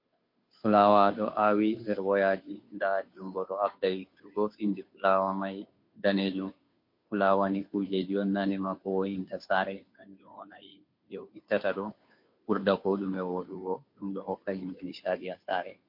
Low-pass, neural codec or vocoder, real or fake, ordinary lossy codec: 5.4 kHz; codec, 16 kHz, 2 kbps, FunCodec, trained on Chinese and English, 25 frames a second; fake; MP3, 32 kbps